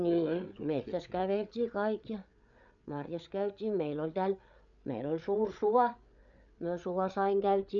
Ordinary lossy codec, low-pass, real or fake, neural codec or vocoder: none; 7.2 kHz; fake; codec, 16 kHz, 8 kbps, FreqCodec, larger model